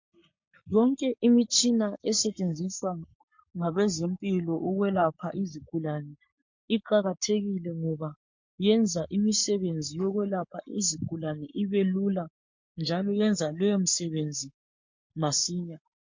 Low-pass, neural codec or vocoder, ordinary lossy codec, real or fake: 7.2 kHz; codec, 44.1 kHz, 7.8 kbps, Pupu-Codec; MP3, 48 kbps; fake